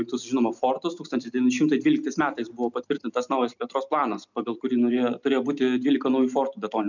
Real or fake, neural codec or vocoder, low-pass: real; none; 7.2 kHz